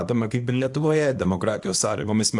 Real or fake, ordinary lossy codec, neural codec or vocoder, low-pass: fake; AAC, 64 kbps; codec, 24 kHz, 0.9 kbps, WavTokenizer, small release; 10.8 kHz